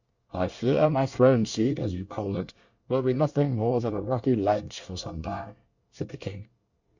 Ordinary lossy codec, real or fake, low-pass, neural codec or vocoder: Opus, 64 kbps; fake; 7.2 kHz; codec, 24 kHz, 1 kbps, SNAC